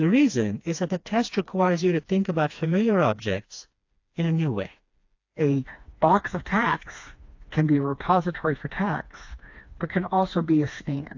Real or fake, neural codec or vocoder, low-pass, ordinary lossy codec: fake; codec, 16 kHz, 2 kbps, FreqCodec, smaller model; 7.2 kHz; AAC, 48 kbps